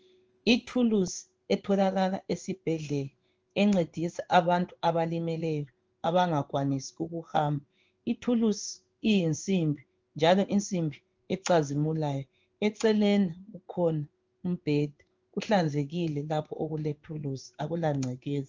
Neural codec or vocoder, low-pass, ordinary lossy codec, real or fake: codec, 16 kHz in and 24 kHz out, 1 kbps, XY-Tokenizer; 7.2 kHz; Opus, 24 kbps; fake